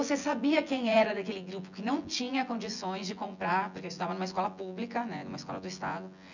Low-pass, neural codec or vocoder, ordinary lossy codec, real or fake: 7.2 kHz; vocoder, 24 kHz, 100 mel bands, Vocos; none; fake